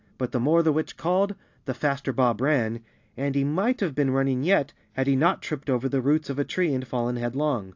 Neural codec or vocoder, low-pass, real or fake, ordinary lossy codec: none; 7.2 kHz; real; AAC, 48 kbps